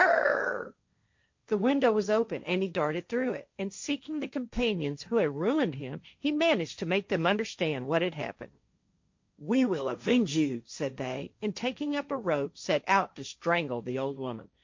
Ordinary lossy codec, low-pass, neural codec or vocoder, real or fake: MP3, 48 kbps; 7.2 kHz; codec, 16 kHz, 1.1 kbps, Voila-Tokenizer; fake